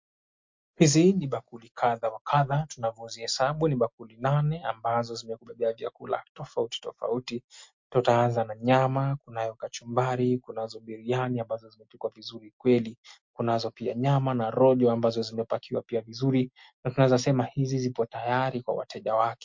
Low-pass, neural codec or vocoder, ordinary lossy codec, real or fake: 7.2 kHz; none; MP3, 48 kbps; real